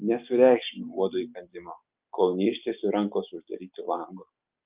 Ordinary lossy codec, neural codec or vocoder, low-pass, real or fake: Opus, 32 kbps; none; 3.6 kHz; real